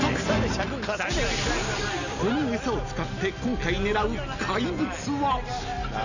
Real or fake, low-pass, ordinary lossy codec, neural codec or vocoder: real; 7.2 kHz; none; none